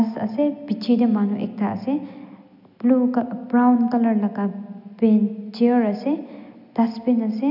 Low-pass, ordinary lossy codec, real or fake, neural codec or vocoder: 5.4 kHz; none; real; none